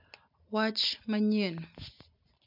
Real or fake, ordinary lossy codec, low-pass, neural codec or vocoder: real; MP3, 48 kbps; 5.4 kHz; none